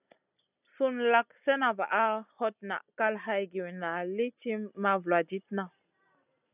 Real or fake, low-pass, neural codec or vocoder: real; 3.6 kHz; none